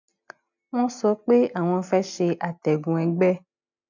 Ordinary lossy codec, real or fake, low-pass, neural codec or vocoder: none; real; 7.2 kHz; none